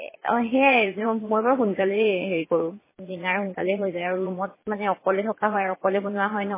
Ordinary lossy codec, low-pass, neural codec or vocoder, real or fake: MP3, 16 kbps; 3.6 kHz; vocoder, 22.05 kHz, 80 mel bands, Vocos; fake